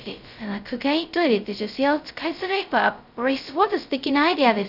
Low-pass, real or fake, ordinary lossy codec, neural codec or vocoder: 5.4 kHz; fake; none; codec, 16 kHz, 0.2 kbps, FocalCodec